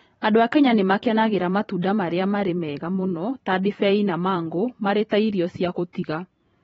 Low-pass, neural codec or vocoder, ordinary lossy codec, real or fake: 19.8 kHz; vocoder, 44.1 kHz, 128 mel bands every 512 samples, BigVGAN v2; AAC, 24 kbps; fake